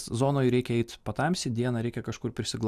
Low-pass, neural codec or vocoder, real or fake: 14.4 kHz; none; real